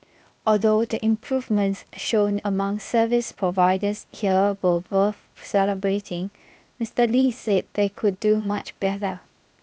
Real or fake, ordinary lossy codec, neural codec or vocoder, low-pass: fake; none; codec, 16 kHz, 0.8 kbps, ZipCodec; none